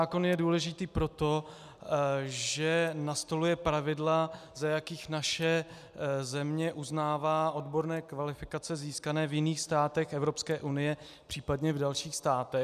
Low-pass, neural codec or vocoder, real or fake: 14.4 kHz; none; real